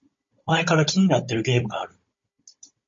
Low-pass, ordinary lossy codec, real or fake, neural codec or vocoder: 7.2 kHz; MP3, 32 kbps; fake; codec, 16 kHz, 16 kbps, FunCodec, trained on Chinese and English, 50 frames a second